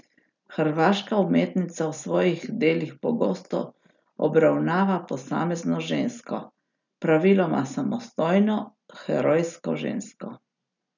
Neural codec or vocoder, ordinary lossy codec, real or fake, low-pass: none; none; real; 7.2 kHz